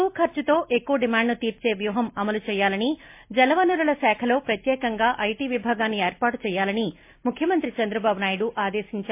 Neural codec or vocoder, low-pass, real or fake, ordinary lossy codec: none; 3.6 kHz; real; MP3, 24 kbps